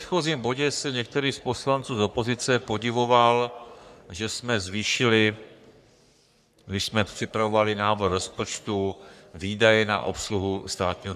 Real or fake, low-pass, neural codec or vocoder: fake; 14.4 kHz; codec, 44.1 kHz, 3.4 kbps, Pupu-Codec